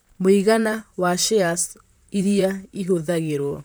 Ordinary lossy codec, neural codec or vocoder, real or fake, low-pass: none; vocoder, 44.1 kHz, 128 mel bands, Pupu-Vocoder; fake; none